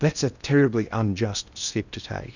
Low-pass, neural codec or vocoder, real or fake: 7.2 kHz; codec, 16 kHz in and 24 kHz out, 0.8 kbps, FocalCodec, streaming, 65536 codes; fake